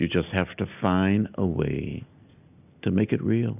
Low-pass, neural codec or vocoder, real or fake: 3.6 kHz; none; real